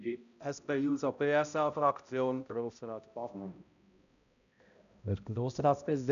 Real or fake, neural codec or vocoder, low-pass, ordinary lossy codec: fake; codec, 16 kHz, 0.5 kbps, X-Codec, HuBERT features, trained on balanced general audio; 7.2 kHz; none